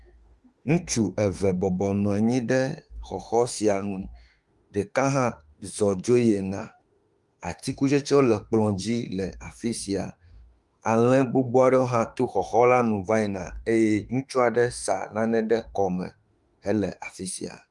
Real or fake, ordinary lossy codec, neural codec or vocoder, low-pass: fake; Opus, 32 kbps; autoencoder, 48 kHz, 32 numbers a frame, DAC-VAE, trained on Japanese speech; 10.8 kHz